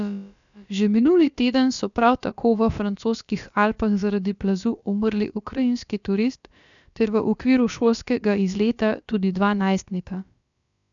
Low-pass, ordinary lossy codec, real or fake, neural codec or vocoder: 7.2 kHz; none; fake; codec, 16 kHz, about 1 kbps, DyCAST, with the encoder's durations